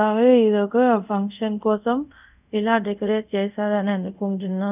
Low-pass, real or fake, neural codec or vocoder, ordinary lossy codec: 3.6 kHz; fake; codec, 24 kHz, 0.5 kbps, DualCodec; none